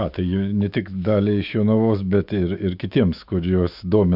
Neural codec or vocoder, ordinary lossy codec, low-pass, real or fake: autoencoder, 48 kHz, 128 numbers a frame, DAC-VAE, trained on Japanese speech; AAC, 48 kbps; 5.4 kHz; fake